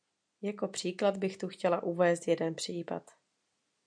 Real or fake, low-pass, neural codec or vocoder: real; 9.9 kHz; none